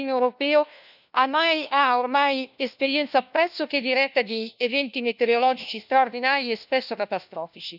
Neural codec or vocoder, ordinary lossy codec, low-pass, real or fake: codec, 16 kHz, 1 kbps, FunCodec, trained on LibriTTS, 50 frames a second; none; 5.4 kHz; fake